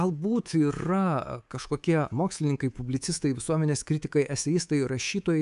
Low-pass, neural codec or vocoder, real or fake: 10.8 kHz; codec, 24 kHz, 3.1 kbps, DualCodec; fake